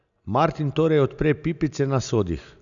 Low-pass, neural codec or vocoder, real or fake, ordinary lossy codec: 7.2 kHz; none; real; none